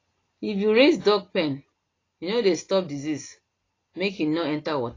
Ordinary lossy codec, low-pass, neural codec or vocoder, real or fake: AAC, 32 kbps; 7.2 kHz; none; real